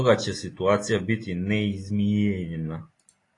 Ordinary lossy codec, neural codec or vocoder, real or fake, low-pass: AAC, 32 kbps; none; real; 10.8 kHz